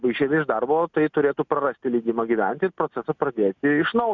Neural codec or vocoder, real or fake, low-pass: none; real; 7.2 kHz